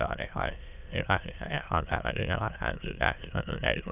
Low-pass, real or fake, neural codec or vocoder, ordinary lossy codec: 3.6 kHz; fake; autoencoder, 22.05 kHz, a latent of 192 numbers a frame, VITS, trained on many speakers; none